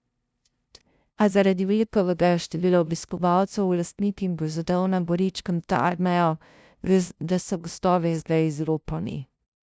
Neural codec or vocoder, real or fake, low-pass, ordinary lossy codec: codec, 16 kHz, 0.5 kbps, FunCodec, trained on LibriTTS, 25 frames a second; fake; none; none